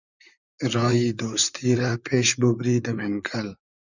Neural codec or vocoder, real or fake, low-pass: vocoder, 44.1 kHz, 128 mel bands, Pupu-Vocoder; fake; 7.2 kHz